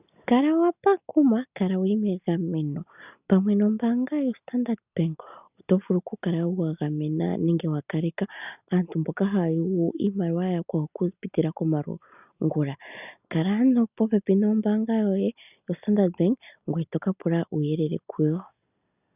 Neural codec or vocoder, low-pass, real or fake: none; 3.6 kHz; real